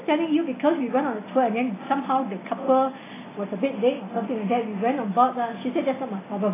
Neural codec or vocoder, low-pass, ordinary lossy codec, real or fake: none; 3.6 kHz; AAC, 16 kbps; real